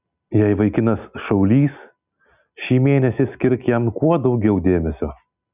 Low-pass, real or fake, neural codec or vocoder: 3.6 kHz; real; none